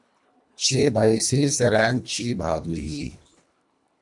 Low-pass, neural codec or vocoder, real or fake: 10.8 kHz; codec, 24 kHz, 1.5 kbps, HILCodec; fake